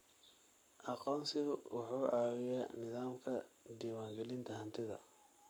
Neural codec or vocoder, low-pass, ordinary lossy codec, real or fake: codec, 44.1 kHz, 7.8 kbps, Pupu-Codec; none; none; fake